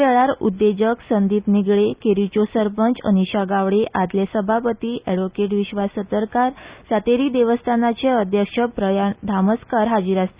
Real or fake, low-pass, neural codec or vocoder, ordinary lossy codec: real; 3.6 kHz; none; Opus, 64 kbps